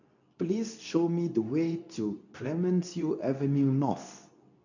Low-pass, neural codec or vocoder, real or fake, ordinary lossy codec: 7.2 kHz; codec, 24 kHz, 0.9 kbps, WavTokenizer, medium speech release version 2; fake; none